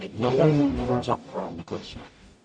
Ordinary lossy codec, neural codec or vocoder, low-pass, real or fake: MP3, 48 kbps; codec, 44.1 kHz, 0.9 kbps, DAC; 9.9 kHz; fake